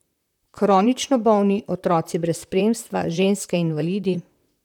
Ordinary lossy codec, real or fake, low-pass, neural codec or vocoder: none; fake; 19.8 kHz; vocoder, 44.1 kHz, 128 mel bands, Pupu-Vocoder